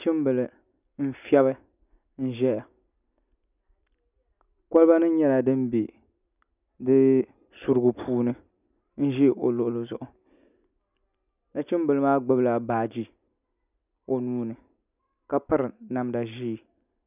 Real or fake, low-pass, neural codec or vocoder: real; 3.6 kHz; none